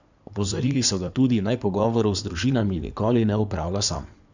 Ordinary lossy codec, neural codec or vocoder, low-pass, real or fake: none; codec, 16 kHz in and 24 kHz out, 2.2 kbps, FireRedTTS-2 codec; 7.2 kHz; fake